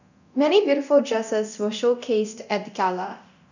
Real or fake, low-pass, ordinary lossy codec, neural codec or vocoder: fake; 7.2 kHz; none; codec, 24 kHz, 0.9 kbps, DualCodec